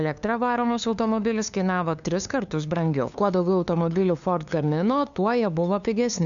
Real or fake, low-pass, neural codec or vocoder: fake; 7.2 kHz; codec, 16 kHz, 2 kbps, FunCodec, trained on LibriTTS, 25 frames a second